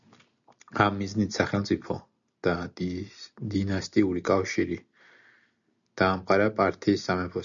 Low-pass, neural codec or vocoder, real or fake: 7.2 kHz; none; real